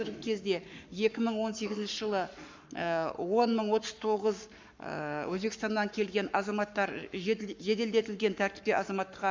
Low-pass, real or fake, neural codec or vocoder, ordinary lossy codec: 7.2 kHz; fake; codec, 44.1 kHz, 7.8 kbps, Pupu-Codec; MP3, 64 kbps